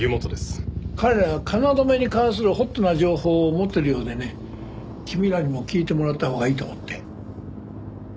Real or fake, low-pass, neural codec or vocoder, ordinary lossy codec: real; none; none; none